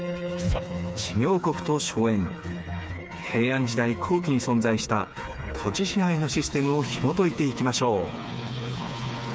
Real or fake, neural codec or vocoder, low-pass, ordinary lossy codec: fake; codec, 16 kHz, 4 kbps, FreqCodec, smaller model; none; none